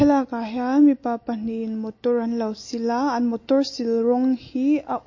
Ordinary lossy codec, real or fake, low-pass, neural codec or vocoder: MP3, 32 kbps; real; 7.2 kHz; none